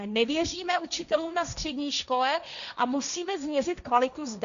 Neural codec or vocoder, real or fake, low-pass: codec, 16 kHz, 1.1 kbps, Voila-Tokenizer; fake; 7.2 kHz